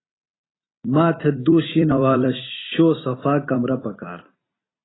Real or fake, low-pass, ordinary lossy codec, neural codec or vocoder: fake; 7.2 kHz; AAC, 16 kbps; vocoder, 44.1 kHz, 128 mel bands every 256 samples, BigVGAN v2